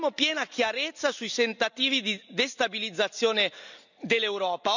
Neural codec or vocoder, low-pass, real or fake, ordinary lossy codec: none; 7.2 kHz; real; none